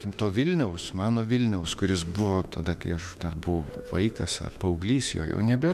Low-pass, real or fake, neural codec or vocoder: 14.4 kHz; fake; autoencoder, 48 kHz, 32 numbers a frame, DAC-VAE, trained on Japanese speech